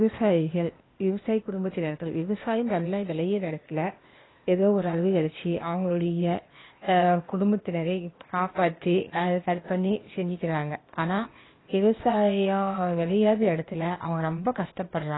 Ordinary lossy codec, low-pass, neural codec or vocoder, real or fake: AAC, 16 kbps; 7.2 kHz; codec, 16 kHz, 0.8 kbps, ZipCodec; fake